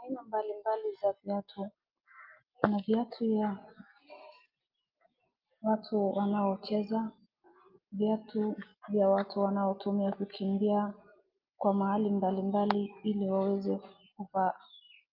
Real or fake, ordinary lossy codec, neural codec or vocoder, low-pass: real; Opus, 32 kbps; none; 5.4 kHz